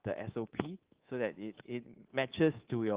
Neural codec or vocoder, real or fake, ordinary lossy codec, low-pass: codec, 24 kHz, 3.1 kbps, DualCodec; fake; Opus, 16 kbps; 3.6 kHz